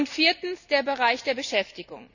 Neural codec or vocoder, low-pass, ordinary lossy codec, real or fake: none; 7.2 kHz; none; real